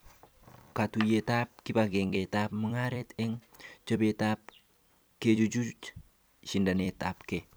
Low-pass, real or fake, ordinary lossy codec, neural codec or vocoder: none; fake; none; vocoder, 44.1 kHz, 128 mel bands every 256 samples, BigVGAN v2